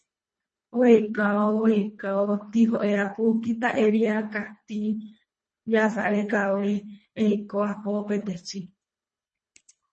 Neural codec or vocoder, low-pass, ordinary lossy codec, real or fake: codec, 24 kHz, 1.5 kbps, HILCodec; 10.8 kHz; MP3, 32 kbps; fake